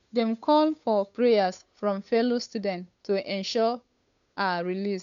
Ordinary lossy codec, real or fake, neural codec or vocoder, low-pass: none; fake; codec, 16 kHz, 8 kbps, FunCodec, trained on Chinese and English, 25 frames a second; 7.2 kHz